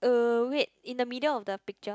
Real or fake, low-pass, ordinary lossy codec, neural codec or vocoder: real; none; none; none